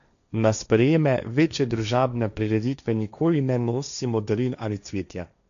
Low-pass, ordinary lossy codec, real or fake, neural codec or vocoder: 7.2 kHz; none; fake; codec, 16 kHz, 1.1 kbps, Voila-Tokenizer